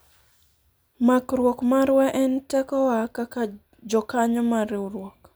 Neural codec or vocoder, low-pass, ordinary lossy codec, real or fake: none; none; none; real